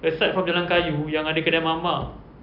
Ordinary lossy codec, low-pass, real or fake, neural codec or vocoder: none; 5.4 kHz; real; none